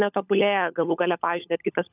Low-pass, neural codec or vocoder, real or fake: 3.6 kHz; codec, 16 kHz, 16 kbps, FunCodec, trained on LibriTTS, 50 frames a second; fake